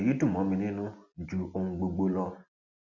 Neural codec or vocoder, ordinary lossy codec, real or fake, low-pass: none; Opus, 64 kbps; real; 7.2 kHz